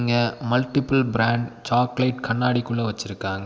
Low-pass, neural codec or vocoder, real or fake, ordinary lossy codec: none; none; real; none